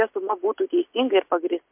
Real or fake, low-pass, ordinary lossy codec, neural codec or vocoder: real; 3.6 kHz; MP3, 32 kbps; none